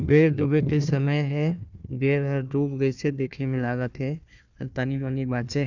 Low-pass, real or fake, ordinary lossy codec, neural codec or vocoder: 7.2 kHz; fake; none; codec, 16 kHz, 1 kbps, FunCodec, trained on Chinese and English, 50 frames a second